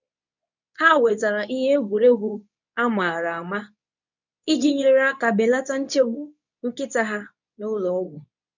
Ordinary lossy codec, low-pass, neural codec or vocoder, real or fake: none; 7.2 kHz; codec, 24 kHz, 0.9 kbps, WavTokenizer, medium speech release version 2; fake